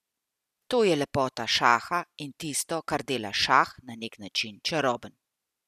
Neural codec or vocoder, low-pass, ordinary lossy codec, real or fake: none; 14.4 kHz; none; real